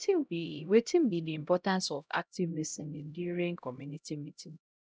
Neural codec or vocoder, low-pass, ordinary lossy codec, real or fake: codec, 16 kHz, 0.5 kbps, X-Codec, HuBERT features, trained on LibriSpeech; none; none; fake